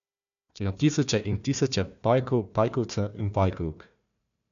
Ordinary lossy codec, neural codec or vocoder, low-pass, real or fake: none; codec, 16 kHz, 1 kbps, FunCodec, trained on Chinese and English, 50 frames a second; 7.2 kHz; fake